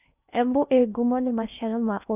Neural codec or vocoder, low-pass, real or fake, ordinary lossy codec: codec, 16 kHz in and 24 kHz out, 0.6 kbps, FocalCodec, streaming, 2048 codes; 3.6 kHz; fake; none